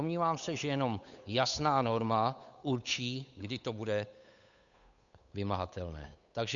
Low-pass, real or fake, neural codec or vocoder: 7.2 kHz; fake; codec, 16 kHz, 8 kbps, FunCodec, trained on Chinese and English, 25 frames a second